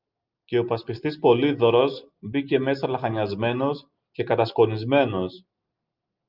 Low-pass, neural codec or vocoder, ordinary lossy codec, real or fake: 5.4 kHz; none; Opus, 32 kbps; real